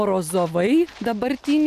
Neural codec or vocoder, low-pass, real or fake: none; 14.4 kHz; real